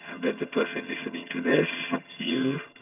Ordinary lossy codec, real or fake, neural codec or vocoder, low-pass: none; fake; vocoder, 22.05 kHz, 80 mel bands, HiFi-GAN; 3.6 kHz